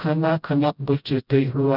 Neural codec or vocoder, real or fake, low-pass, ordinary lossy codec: codec, 16 kHz, 0.5 kbps, FreqCodec, smaller model; fake; 5.4 kHz; none